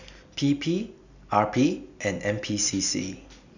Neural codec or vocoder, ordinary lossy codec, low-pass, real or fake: none; none; 7.2 kHz; real